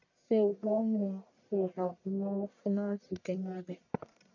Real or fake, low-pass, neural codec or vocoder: fake; 7.2 kHz; codec, 44.1 kHz, 1.7 kbps, Pupu-Codec